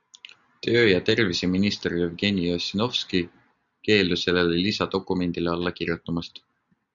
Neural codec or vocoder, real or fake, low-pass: none; real; 7.2 kHz